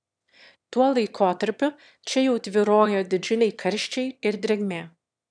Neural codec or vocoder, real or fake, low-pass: autoencoder, 22.05 kHz, a latent of 192 numbers a frame, VITS, trained on one speaker; fake; 9.9 kHz